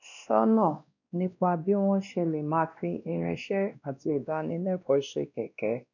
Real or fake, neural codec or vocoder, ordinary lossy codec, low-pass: fake; codec, 16 kHz, 1 kbps, X-Codec, WavLM features, trained on Multilingual LibriSpeech; none; 7.2 kHz